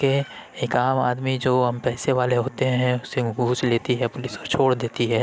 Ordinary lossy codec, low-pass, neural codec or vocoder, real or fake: none; none; none; real